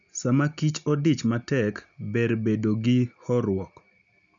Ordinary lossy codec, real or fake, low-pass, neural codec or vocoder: none; real; 7.2 kHz; none